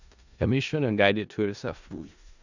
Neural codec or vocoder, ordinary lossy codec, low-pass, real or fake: codec, 16 kHz in and 24 kHz out, 0.4 kbps, LongCat-Audio-Codec, four codebook decoder; none; 7.2 kHz; fake